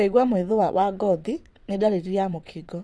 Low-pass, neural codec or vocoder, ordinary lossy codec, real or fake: none; vocoder, 22.05 kHz, 80 mel bands, WaveNeXt; none; fake